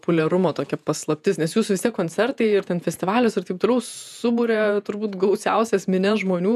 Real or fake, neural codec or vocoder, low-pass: fake; vocoder, 48 kHz, 128 mel bands, Vocos; 14.4 kHz